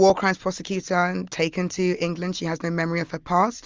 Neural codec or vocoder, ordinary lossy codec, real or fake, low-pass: none; Opus, 32 kbps; real; 7.2 kHz